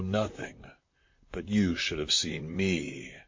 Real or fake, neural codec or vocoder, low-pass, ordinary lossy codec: fake; vocoder, 44.1 kHz, 128 mel bands, Pupu-Vocoder; 7.2 kHz; MP3, 48 kbps